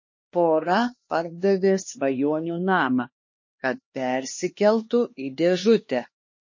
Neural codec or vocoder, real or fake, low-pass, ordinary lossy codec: codec, 16 kHz, 2 kbps, X-Codec, HuBERT features, trained on LibriSpeech; fake; 7.2 kHz; MP3, 32 kbps